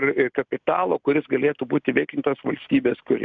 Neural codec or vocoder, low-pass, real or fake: none; 7.2 kHz; real